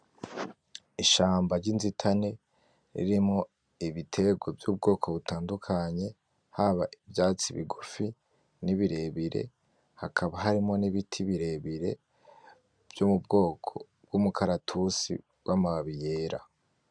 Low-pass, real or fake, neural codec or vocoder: 9.9 kHz; real; none